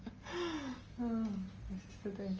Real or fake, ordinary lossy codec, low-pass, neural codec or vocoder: real; Opus, 24 kbps; 7.2 kHz; none